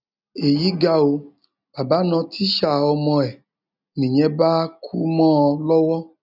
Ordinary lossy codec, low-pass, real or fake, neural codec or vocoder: none; 5.4 kHz; real; none